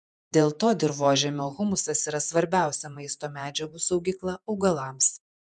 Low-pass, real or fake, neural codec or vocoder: 10.8 kHz; fake; vocoder, 48 kHz, 128 mel bands, Vocos